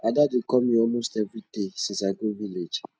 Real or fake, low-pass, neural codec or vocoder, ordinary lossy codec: real; none; none; none